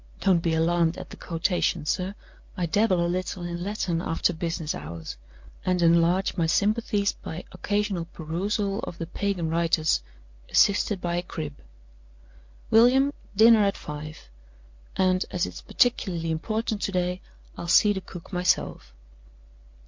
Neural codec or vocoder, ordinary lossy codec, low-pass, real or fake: none; MP3, 48 kbps; 7.2 kHz; real